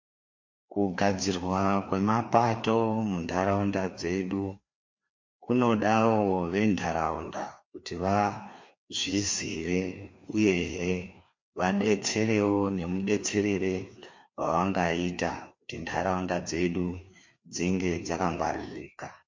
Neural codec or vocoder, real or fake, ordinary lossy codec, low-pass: codec, 16 kHz, 2 kbps, FreqCodec, larger model; fake; MP3, 48 kbps; 7.2 kHz